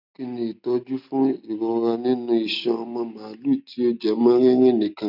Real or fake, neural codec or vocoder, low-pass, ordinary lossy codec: real; none; 5.4 kHz; none